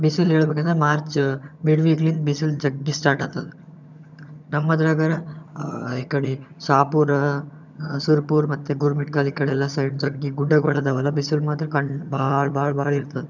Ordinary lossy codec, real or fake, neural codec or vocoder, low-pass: none; fake; vocoder, 22.05 kHz, 80 mel bands, HiFi-GAN; 7.2 kHz